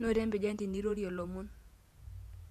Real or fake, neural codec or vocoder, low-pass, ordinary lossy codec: fake; vocoder, 48 kHz, 128 mel bands, Vocos; 14.4 kHz; MP3, 96 kbps